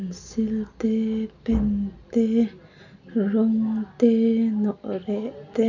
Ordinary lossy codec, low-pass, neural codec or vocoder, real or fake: none; 7.2 kHz; none; real